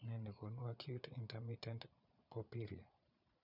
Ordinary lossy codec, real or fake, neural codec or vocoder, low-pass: none; fake; vocoder, 22.05 kHz, 80 mel bands, Vocos; 5.4 kHz